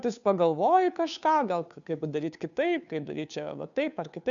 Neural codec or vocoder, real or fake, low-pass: codec, 16 kHz, 2 kbps, FunCodec, trained on Chinese and English, 25 frames a second; fake; 7.2 kHz